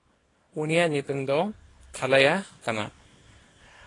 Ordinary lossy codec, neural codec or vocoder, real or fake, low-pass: AAC, 32 kbps; codec, 24 kHz, 0.9 kbps, WavTokenizer, small release; fake; 10.8 kHz